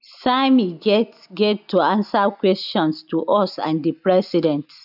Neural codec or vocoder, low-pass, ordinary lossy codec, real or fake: none; 5.4 kHz; none; real